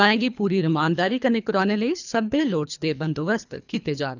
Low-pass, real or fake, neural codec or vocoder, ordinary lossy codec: 7.2 kHz; fake; codec, 24 kHz, 3 kbps, HILCodec; none